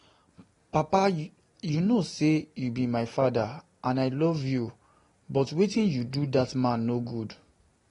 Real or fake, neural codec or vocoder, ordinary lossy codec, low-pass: real; none; AAC, 32 kbps; 10.8 kHz